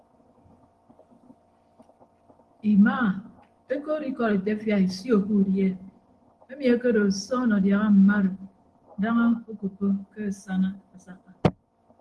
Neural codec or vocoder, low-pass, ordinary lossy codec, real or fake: none; 10.8 kHz; Opus, 16 kbps; real